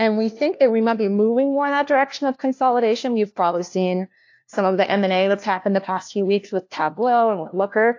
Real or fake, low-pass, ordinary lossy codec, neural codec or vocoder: fake; 7.2 kHz; AAC, 48 kbps; codec, 16 kHz, 1 kbps, FunCodec, trained on LibriTTS, 50 frames a second